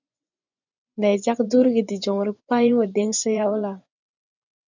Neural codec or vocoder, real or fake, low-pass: vocoder, 24 kHz, 100 mel bands, Vocos; fake; 7.2 kHz